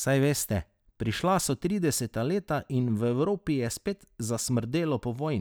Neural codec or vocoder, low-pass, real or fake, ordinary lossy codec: none; none; real; none